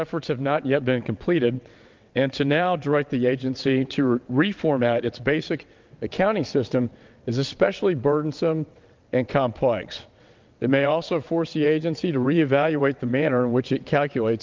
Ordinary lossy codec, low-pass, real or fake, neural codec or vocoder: Opus, 32 kbps; 7.2 kHz; fake; vocoder, 22.05 kHz, 80 mel bands, WaveNeXt